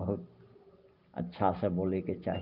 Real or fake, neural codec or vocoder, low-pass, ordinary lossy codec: real; none; 5.4 kHz; Opus, 24 kbps